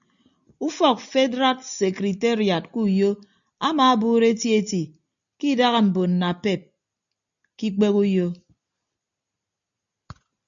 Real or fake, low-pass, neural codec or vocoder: real; 7.2 kHz; none